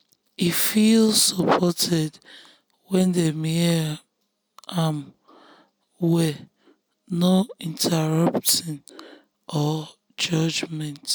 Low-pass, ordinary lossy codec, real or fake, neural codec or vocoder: none; none; real; none